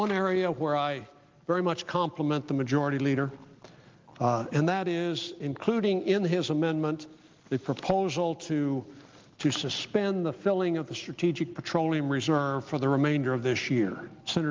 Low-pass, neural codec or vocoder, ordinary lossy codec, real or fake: 7.2 kHz; none; Opus, 32 kbps; real